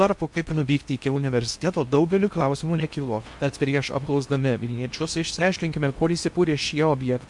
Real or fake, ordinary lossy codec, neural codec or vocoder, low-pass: fake; MP3, 64 kbps; codec, 16 kHz in and 24 kHz out, 0.8 kbps, FocalCodec, streaming, 65536 codes; 10.8 kHz